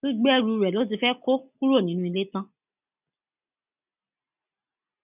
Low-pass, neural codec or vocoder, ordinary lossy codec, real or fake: 3.6 kHz; none; none; real